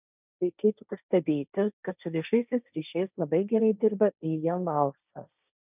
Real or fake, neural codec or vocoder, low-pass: fake; codec, 16 kHz, 1.1 kbps, Voila-Tokenizer; 3.6 kHz